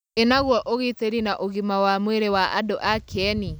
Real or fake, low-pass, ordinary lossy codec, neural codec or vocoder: real; none; none; none